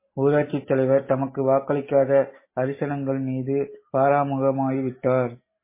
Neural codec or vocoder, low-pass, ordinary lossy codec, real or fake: none; 3.6 kHz; MP3, 16 kbps; real